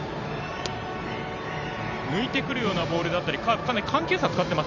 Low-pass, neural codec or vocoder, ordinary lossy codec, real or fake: 7.2 kHz; vocoder, 44.1 kHz, 128 mel bands every 512 samples, BigVGAN v2; none; fake